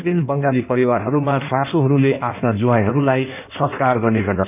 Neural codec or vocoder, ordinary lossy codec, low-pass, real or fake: codec, 16 kHz in and 24 kHz out, 1.1 kbps, FireRedTTS-2 codec; none; 3.6 kHz; fake